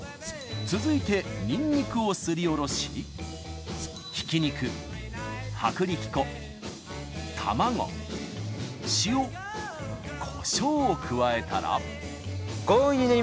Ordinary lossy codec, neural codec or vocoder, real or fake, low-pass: none; none; real; none